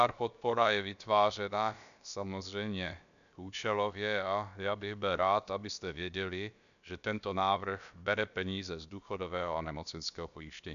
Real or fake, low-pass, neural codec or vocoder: fake; 7.2 kHz; codec, 16 kHz, about 1 kbps, DyCAST, with the encoder's durations